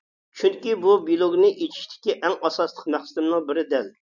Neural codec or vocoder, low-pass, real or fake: none; 7.2 kHz; real